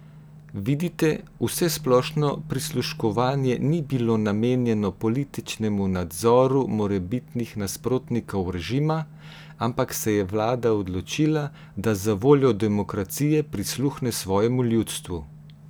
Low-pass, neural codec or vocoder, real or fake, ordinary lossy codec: none; none; real; none